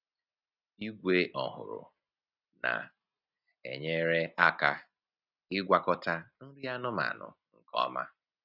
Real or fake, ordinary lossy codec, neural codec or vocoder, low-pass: real; none; none; 5.4 kHz